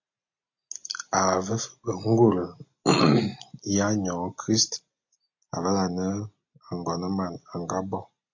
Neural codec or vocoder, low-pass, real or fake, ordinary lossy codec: none; 7.2 kHz; real; AAC, 48 kbps